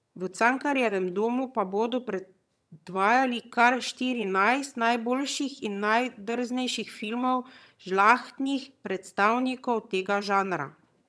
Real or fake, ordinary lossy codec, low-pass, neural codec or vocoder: fake; none; none; vocoder, 22.05 kHz, 80 mel bands, HiFi-GAN